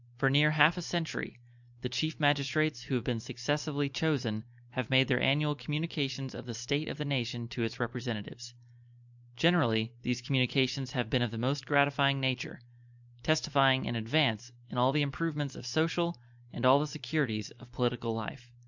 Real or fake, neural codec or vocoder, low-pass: real; none; 7.2 kHz